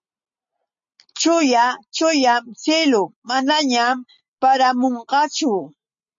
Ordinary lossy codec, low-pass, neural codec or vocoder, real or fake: MP3, 48 kbps; 7.2 kHz; none; real